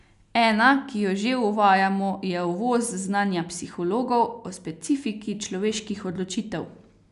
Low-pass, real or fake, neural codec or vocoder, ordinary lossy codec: 10.8 kHz; real; none; none